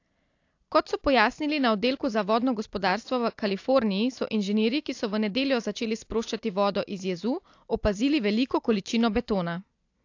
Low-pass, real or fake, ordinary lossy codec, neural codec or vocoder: 7.2 kHz; real; AAC, 48 kbps; none